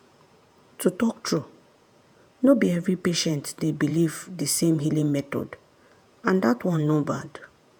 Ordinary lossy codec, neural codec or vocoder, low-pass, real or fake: none; vocoder, 48 kHz, 128 mel bands, Vocos; none; fake